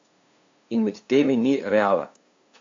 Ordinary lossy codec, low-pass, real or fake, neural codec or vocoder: AAC, 48 kbps; 7.2 kHz; fake; codec, 16 kHz, 2 kbps, FunCodec, trained on LibriTTS, 25 frames a second